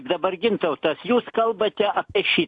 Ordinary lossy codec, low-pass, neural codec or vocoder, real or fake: AAC, 48 kbps; 10.8 kHz; none; real